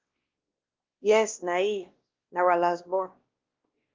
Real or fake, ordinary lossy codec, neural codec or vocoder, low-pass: fake; Opus, 16 kbps; codec, 16 kHz, 1 kbps, X-Codec, WavLM features, trained on Multilingual LibriSpeech; 7.2 kHz